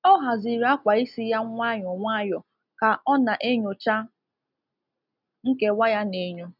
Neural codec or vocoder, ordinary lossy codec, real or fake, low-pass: none; none; real; 5.4 kHz